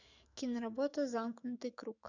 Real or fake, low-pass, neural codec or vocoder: fake; 7.2 kHz; autoencoder, 48 kHz, 128 numbers a frame, DAC-VAE, trained on Japanese speech